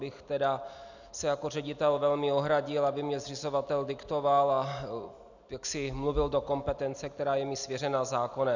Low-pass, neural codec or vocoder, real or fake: 7.2 kHz; none; real